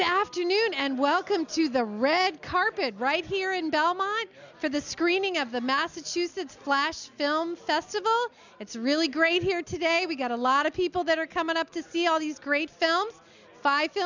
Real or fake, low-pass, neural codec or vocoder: real; 7.2 kHz; none